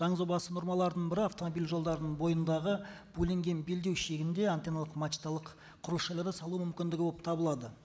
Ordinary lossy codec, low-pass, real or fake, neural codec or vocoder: none; none; real; none